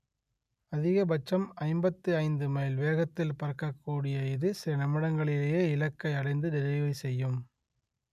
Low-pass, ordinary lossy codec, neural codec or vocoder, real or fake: 14.4 kHz; none; none; real